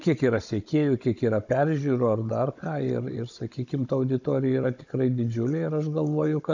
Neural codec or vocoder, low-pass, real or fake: codec, 16 kHz, 16 kbps, FunCodec, trained on Chinese and English, 50 frames a second; 7.2 kHz; fake